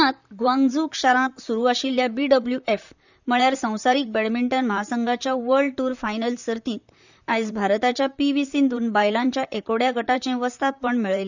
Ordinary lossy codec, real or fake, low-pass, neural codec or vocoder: none; fake; 7.2 kHz; vocoder, 44.1 kHz, 128 mel bands, Pupu-Vocoder